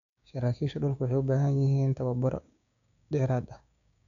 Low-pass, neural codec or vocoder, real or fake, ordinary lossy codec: 7.2 kHz; codec, 16 kHz, 6 kbps, DAC; fake; none